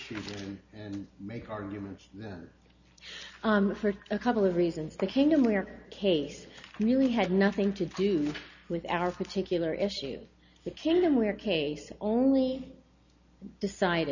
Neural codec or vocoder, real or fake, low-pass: none; real; 7.2 kHz